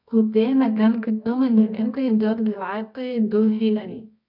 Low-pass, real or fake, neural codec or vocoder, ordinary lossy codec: 5.4 kHz; fake; codec, 24 kHz, 0.9 kbps, WavTokenizer, medium music audio release; MP3, 48 kbps